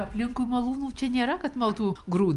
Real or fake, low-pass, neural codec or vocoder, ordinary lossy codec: real; 10.8 kHz; none; Opus, 32 kbps